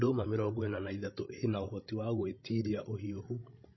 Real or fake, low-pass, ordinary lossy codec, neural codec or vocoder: fake; 7.2 kHz; MP3, 24 kbps; codec, 16 kHz, 16 kbps, FreqCodec, larger model